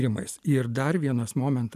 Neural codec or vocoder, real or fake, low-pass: none; real; 14.4 kHz